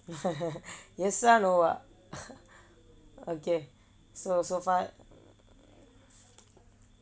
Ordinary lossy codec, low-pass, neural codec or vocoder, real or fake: none; none; none; real